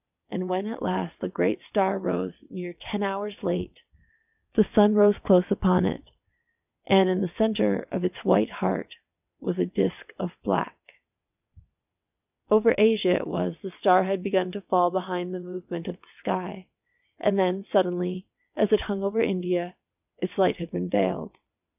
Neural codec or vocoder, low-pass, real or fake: vocoder, 22.05 kHz, 80 mel bands, WaveNeXt; 3.6 kHz; fake